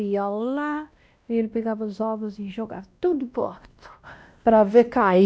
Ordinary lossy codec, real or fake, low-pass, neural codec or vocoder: none; fake; none; codec, 16 kHz, 1 kbps, X-Codec, WavLM features, trained on Multilingual LibriSpeech